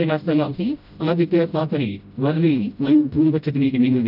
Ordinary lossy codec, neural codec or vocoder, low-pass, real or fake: none; codec, 16 kHz, 0.5 kbps, FreqCodec, smaller model; 5.4 kHz; fake